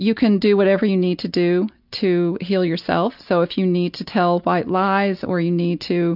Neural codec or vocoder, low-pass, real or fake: none; 5.4 kHz; real